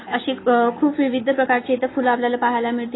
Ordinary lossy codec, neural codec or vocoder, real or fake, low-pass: AAC, 16 kbps; none; real; 7.2 kHz